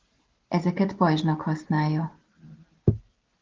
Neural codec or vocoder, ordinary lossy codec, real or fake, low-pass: none; Opus, 16 kbps; real; 7.2 kHz